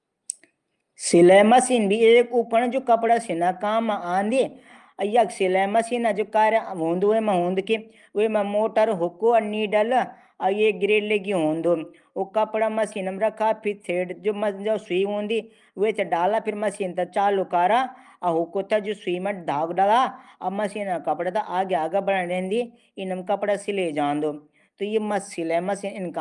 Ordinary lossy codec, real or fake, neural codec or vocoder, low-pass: Opus, 32 kbps; real; none; 10.8 kHz